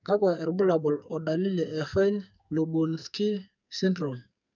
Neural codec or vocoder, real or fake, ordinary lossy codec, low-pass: codec, 44.1 kHz, 2.6 kbps, SNAC; fake; none; 7.2 kHz